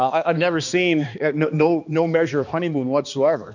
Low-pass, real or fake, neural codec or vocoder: 7.2 kHz; fake; codec, 16 kHz, 2 kbps, X-Codec, HuBERT features, trained on balanced general audio